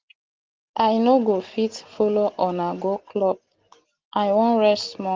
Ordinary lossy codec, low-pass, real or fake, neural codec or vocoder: Opus, 16 kbps; 7.2 kHz; real; none